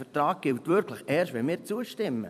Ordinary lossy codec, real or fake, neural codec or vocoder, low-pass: none; fake; vocoder, 44.1 kHz, 128 mel bands every 256 samples, BigVGAN v2; 14.4 kHz